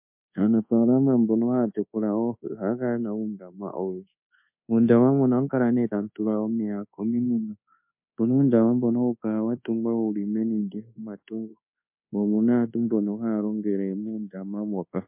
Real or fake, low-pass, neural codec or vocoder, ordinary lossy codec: fake; 3.6 kHz; codec, 24 kHz, 1.2 kbps, DualCodec; MP3, 32 kbps